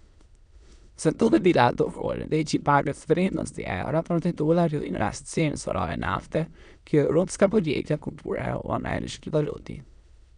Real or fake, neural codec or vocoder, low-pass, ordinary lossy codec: fake; autoencoder, 22.05 kHz, a latent of 192 numbers a frame, VITS, trained on many speakers; 9.9 kHz; none